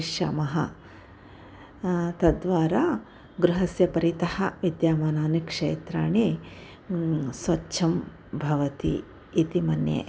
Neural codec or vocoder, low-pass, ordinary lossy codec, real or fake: none; none; none; real